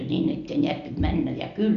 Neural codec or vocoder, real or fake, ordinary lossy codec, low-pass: none; real; none; 7.2 kHz